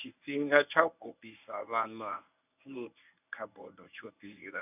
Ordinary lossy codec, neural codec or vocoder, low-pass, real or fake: none; codec, 24 kHz, 0.9 kbps, WavTokenizer, medium speech release version 1; 3.6 kHz; fake